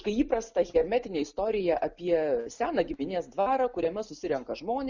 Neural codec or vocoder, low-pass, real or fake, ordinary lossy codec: none; 7.2 kHz; real; Opus, 64 kbps